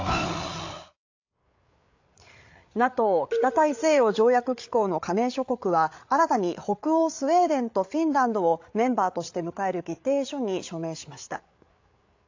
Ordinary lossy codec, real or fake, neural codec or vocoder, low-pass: AAC, 48 kbps; fake; codec, 16 kHz, 8 kbps, FreqCodec, larger model; 7.2 kHz